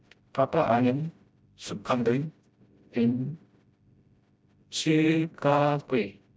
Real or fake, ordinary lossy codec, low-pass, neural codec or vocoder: fake; none; none; codec, 16 kHz, 0.5 kbps, FreqCodec, smaller model